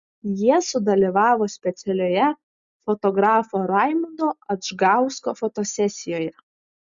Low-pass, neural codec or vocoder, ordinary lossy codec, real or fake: 7.2 kHz; none; Opus, 64 kbps; real